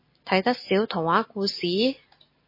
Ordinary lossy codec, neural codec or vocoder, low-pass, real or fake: MP3, 24 kbps; none; 5.4 kHz; real